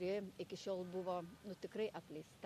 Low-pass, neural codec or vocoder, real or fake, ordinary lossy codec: 14.4 kHz; none; real; MP3, 64 kbps